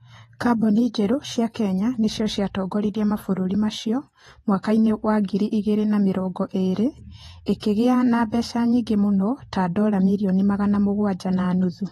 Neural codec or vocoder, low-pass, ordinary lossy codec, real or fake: vocoder, 48 kHz, 128 mel bands, Vocos; 19.8 kHz; AAC, 32 kbps; fake